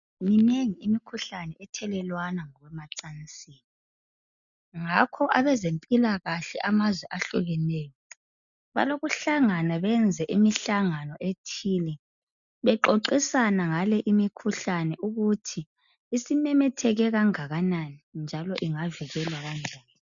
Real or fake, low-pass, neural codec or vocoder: real; 7.2 kHz; none